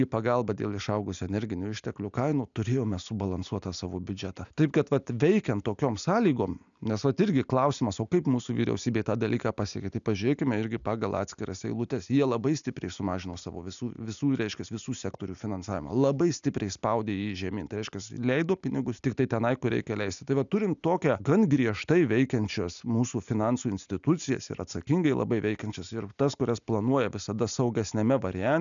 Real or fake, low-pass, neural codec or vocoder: real; 7.2 kHz; none